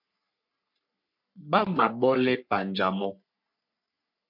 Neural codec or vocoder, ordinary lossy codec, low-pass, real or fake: codec, 44.1 kHz, 3.4 kbps, Pupu-Codec; MP3, 48 kbps; 5.4 kHz; fake